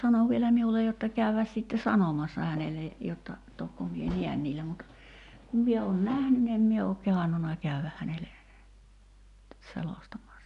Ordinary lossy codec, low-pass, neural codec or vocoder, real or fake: none; 10.8 kHz; none; real